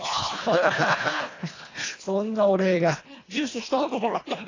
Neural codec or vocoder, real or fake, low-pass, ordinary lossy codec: codec, 24 kHz, 1.5 kbps, HILCodec; fake; 7.2 kHz; AAC, 32 kbps